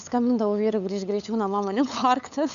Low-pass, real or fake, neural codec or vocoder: 7.2 kHz; fake; codec, 16 kHz, 8 kbps, FunCodec, trained on LibriTTS, 25 frames a second